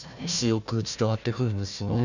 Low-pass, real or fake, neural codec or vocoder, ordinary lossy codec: 7.2 kHz; fake; codec, 16 kHz, 1 kbps, FunCodec, trained on Chinese and English, 50 frames a second; none